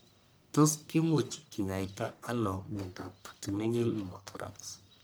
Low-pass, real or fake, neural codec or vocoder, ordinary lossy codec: none; fake; codec, 44.1 kHz, 1.7 kbps, Pupu-Codec; none